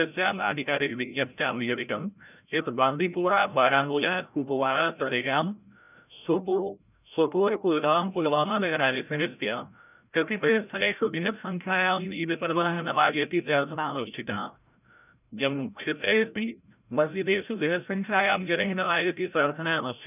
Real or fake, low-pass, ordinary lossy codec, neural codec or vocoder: fake; 3.6 kHz; none; codec, 16 kHz, 0.5 kbps, FreqCodec, larger model